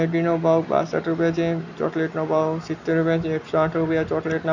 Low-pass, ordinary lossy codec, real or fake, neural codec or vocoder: 7.2 kHz; none; real; none